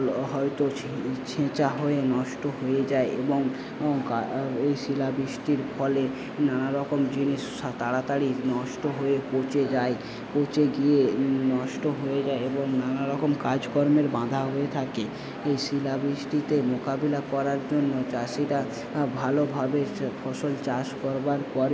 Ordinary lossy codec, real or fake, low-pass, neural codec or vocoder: none; real; none; none